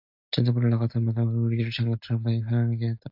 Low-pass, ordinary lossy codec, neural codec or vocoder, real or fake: 5.4 kHz; AAC, 48 kbps; none; real